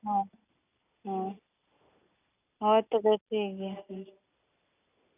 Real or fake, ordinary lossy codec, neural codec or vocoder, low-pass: real; none; none; 3.6 kHz